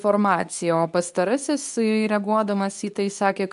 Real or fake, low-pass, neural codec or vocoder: fake; 10.8 kHz; codec, 24 kHz, 0.9 kbps, WavTokenizer, medium speech release version 2